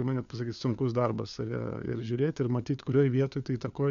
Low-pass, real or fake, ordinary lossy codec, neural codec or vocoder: 7.2 kHz; fake; MP3, 96 kbps; codec, 16 kHz, 4 kbps, FunCodec, trained on LibriTTS, 50 frames a second